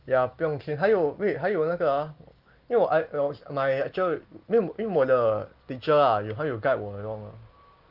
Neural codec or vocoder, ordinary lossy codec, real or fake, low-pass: none; Opus, 32 kbps; real; 5.4 kHz